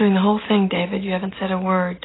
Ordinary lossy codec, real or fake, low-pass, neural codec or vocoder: AAC, 16 kbps; real; 7.2 kHz; none